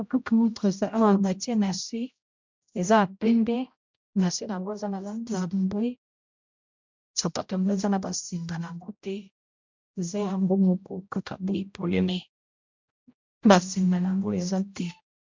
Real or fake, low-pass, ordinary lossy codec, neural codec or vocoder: fake; 7.2 kHz; MP3, 64 kbps; codec, 16 kHz, 0.5 kbps, X-Codec, HuBERT features, trained on general audio